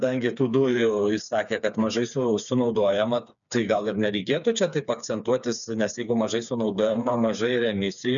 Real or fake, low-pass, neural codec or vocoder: fake; 7.2 kHz; codec, 16 kHz, 4 kbps, FreqCodec, smaller model